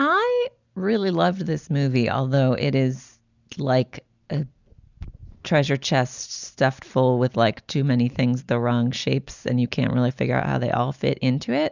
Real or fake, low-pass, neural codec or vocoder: real; 7.2 kHz; none